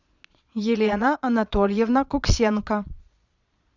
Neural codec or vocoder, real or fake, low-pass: vocoder, 22.05 kHz, 80 mel bands, Vocos; fake; 7.2 kHz